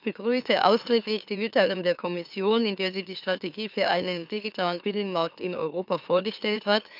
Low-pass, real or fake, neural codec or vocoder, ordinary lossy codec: 5.4 kHz; fake; autoencoder, 44.1 kHz, a latent of 192 numbers a frame, MeloTTS; none